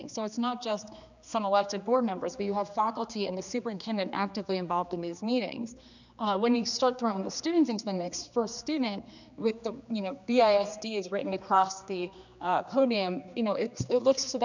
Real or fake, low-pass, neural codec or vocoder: fake; 7.2 kHz; codec, 16 kHz, 2 kbps, X-Codec, HuBERT features, trained on general audio